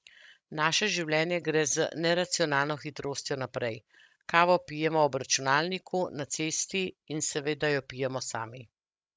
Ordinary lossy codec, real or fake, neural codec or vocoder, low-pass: none; fake; codec, 16 kHz, 16 kbps, FreqCodec, larger model; none